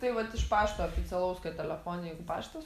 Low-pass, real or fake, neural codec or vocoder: 14.4 kHz; real; none